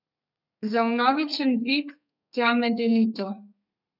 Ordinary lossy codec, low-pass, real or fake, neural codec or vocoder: none; 5.4 kHz; fake; codec, 32 kHz, 1.9 kbps, SNAC